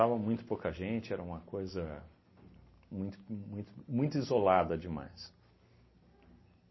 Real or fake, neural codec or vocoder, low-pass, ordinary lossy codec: real; none; 7.2 kHz; MP3, 24 kbps